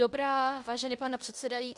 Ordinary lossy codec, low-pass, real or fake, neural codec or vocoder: MP3, 64 kbps; 10.8 kHz; fake; codec, 16 kHz in and 24 kHz out, 0.9 kbps, LongCat-Audio-Codec, fine tuned four codebook decoder